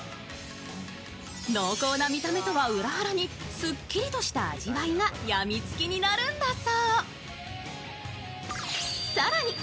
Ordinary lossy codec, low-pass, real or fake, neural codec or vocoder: none; none; real; none